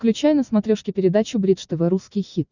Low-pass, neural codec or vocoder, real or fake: 7.2 kHz; none; real